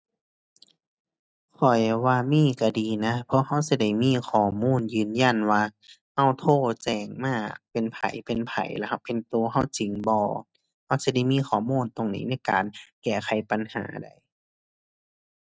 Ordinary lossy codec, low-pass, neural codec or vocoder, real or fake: none; none; none; real